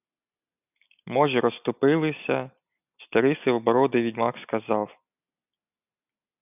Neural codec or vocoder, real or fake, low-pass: none; real; 3.6 kHz